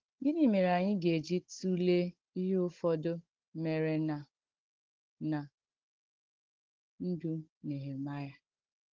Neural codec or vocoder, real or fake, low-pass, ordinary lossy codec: codec, 16 kHz, 16 kbps, FunCodec, trained on Chinese and English, 50 frames a second; fake; 7.2 kHz; Opus, 16 kbps